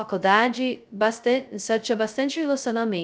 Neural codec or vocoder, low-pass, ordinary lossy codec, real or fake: codec, 16 kHz, 0.2 kbps, FocalCodec; none; none; fake